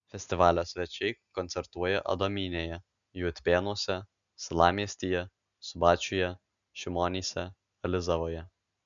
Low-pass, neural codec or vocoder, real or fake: 7.2 kHz; none; real